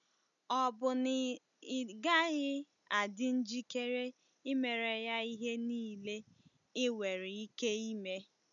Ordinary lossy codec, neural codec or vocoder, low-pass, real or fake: none; none; 7.2 kHz; real